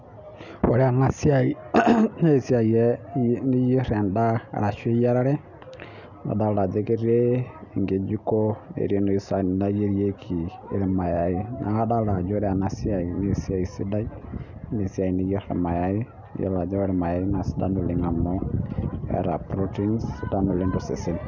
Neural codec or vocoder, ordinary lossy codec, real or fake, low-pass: none; none; real; 7.2 kHz